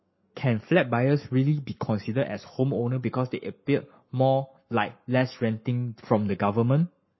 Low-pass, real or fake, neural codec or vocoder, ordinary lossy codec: 7.2 kHz; fake; codec, 44.1 kHz, 7.8 kbps, Pupu-Codec; MP3, 24 kbps